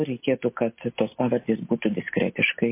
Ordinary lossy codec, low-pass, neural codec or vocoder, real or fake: MP3, 24 kbps; 3.6 kHz; none; real